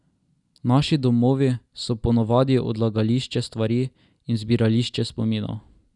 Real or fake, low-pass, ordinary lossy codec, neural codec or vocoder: real; 10.8 kHz; none; none